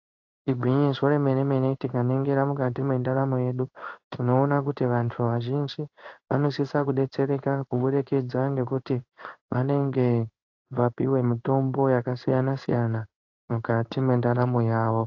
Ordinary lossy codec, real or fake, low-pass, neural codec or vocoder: AAC, 48 kbps; fake; 7.2 kHz; codec, 16 kHz in and 24 kHz out, 1 kbps, XY-Tokenizer